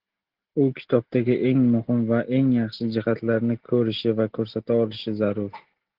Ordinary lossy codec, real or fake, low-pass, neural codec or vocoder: Opus, 16 kbps; real; 5.4 kHz; none